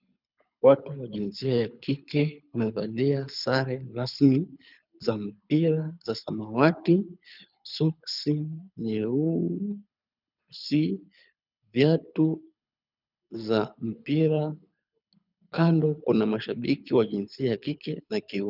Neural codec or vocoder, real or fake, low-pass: codec, 24 kHz, 6 kbps, HILCodec; fake; 5.4 kHz